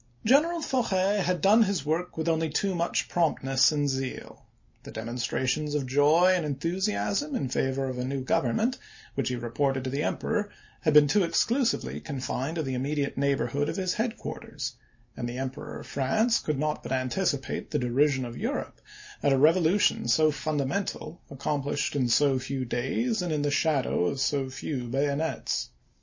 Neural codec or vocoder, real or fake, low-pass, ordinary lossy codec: none; real; 7.2 kHz; MP3, 32 kbps